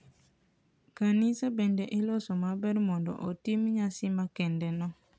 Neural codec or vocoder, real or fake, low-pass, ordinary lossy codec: none; real; none; none